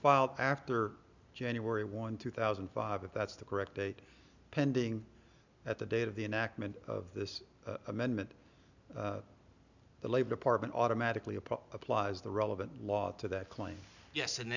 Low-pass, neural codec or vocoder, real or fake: 7.2 kHz; none; real